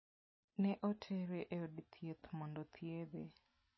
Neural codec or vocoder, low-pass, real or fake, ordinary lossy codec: none; 7.2 kHz; real; MP3, 24 kbps